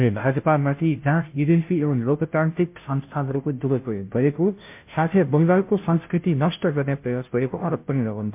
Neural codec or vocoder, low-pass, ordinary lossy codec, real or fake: codec, 16 kHz, 0.5 kbps, FunCodec, trained on Chinese and English, 25 frames a second; 3.6 kHz; MP3, 32 kbps; fake